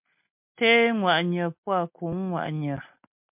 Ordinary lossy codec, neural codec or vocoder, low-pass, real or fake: MP3, 32 kbps; none; 3.6 kHz; real